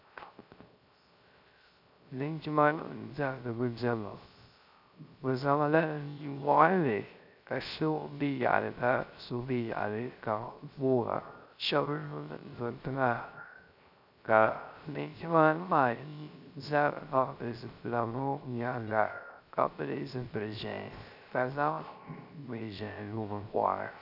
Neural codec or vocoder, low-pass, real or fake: codec, 16 kHz, 0.3 kbps, FocalCodec; 5.4 kHz; fake